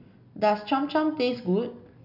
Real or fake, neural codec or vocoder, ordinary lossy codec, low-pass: real; none; none; 5.4 kHz